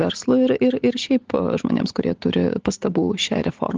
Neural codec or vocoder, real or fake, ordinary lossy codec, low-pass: none; real; Opus, 16 kbps; 7.2 kHz